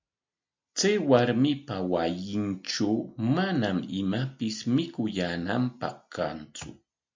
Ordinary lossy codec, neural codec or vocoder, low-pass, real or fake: MP3, 64 kbps; none; 7.2 kHz; real